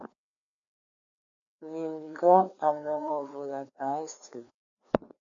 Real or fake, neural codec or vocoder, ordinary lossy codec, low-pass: fake; codec, 16 kHz, 2 kbps, FreqCodec, larger model; MP3, 96 kbps; 7.2 kHz